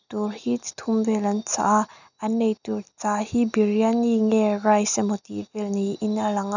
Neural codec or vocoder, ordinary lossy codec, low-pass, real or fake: none; none; 7.2 kHz; real